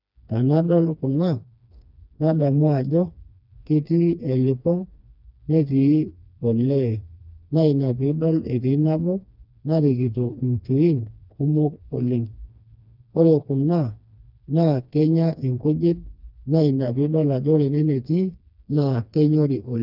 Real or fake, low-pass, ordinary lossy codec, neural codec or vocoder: fake; 5.4 kHz; none; codec, 16 kHz, 2 kbps, FreqCodec, smaller model